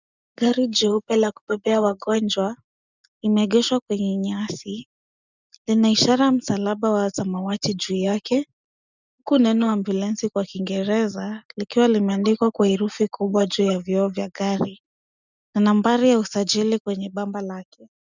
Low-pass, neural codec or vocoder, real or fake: 7.2 kHz; none; real